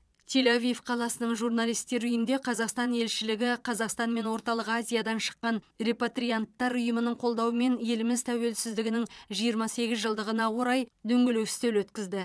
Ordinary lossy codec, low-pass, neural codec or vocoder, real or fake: none; none; vocoder, 22.05 kHz, 80 mel bands, Vocos; fake